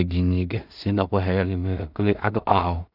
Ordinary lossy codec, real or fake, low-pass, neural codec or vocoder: none; fake; 5.4 kHz; codec, 16 kHz in and 24 kHz out, 0.4 kbps, LongCat-Audio-Codec, two codebook decoder